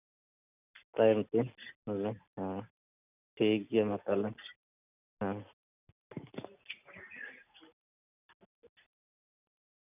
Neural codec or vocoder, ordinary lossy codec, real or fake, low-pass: none; none; real; 3.6 kHz